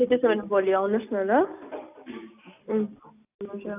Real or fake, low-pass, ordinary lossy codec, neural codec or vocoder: real; 3.6 kHz; AAC, 32 kbps; none